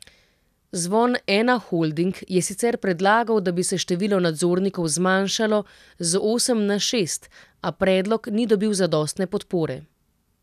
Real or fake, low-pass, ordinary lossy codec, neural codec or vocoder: real; 14.4 kHz; none; none